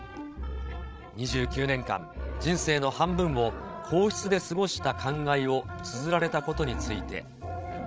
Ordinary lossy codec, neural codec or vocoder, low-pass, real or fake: none; codec, 16 kHz, 16 kbps, FreqCodec, larger model; none; fake